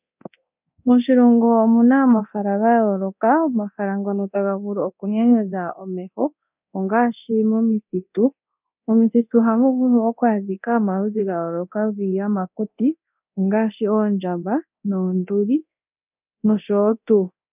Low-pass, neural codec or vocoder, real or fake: 3.6 kHz; codec, 24 kHz, 0.9 kbps, DualCodec; fake